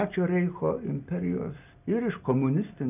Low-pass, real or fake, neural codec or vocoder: 3.6 kHz; real; none